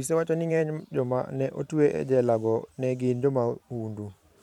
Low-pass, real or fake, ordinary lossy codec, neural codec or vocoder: 19.8 kHz; fake; none; vocoder, 44.1 kHz, 128 mel bands every 512 samples, BigVGAN v2